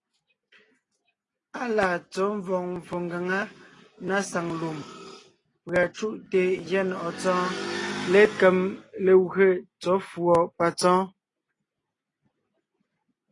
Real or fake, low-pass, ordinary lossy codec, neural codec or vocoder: real; 10.8 kHz; AAC, 32 kbps; none